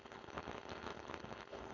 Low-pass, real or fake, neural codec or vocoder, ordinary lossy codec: 7.2 kHz; fake; codec, 16 kHz, 4.8 kbps, FACodec; none